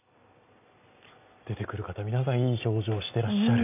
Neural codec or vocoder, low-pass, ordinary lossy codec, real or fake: none; 3.6 kHz; none; real